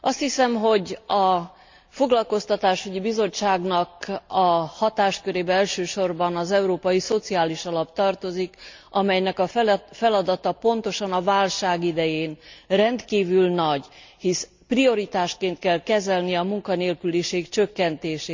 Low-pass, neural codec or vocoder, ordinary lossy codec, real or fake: 7.2 kHz; none; MP3, 64 kbps; real